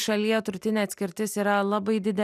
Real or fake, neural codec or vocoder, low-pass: real; none; 14.4 kHz